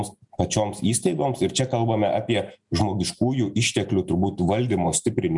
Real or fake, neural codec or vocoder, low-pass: real; none; 10.8 kHz